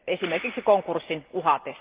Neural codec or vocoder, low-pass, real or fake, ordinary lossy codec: none; 3.6 kHz; real; Opus, 32 kbps